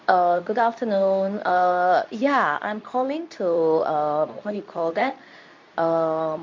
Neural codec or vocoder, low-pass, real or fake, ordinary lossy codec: codec, 24 kHz, 0.9 kbps, WavTokenizer, medium speech release version 2; 7.2 kHz; fake; MP3, 48 kbps